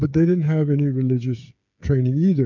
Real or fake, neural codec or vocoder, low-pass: fake; codec, 16 kHz, 8 kbps, FreqCodec, smaller model; 7.2 kHz